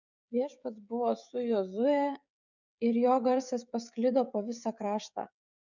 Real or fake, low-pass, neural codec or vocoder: fake; 7.2 kHz; codec, 16 kHz, 16 kbps, FreqCodec, smaller model